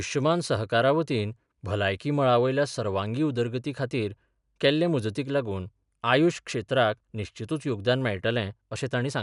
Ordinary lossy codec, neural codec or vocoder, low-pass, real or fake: none; none; 10.8 kHz; real